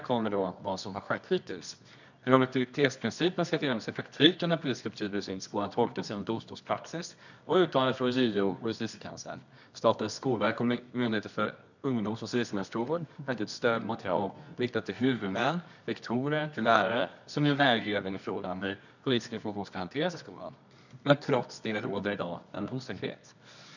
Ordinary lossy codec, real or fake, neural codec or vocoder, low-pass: none; fake; codec, 24 kHz, 0.9 kbps, WavTokenizer, medium music audio release; 7.2 kHz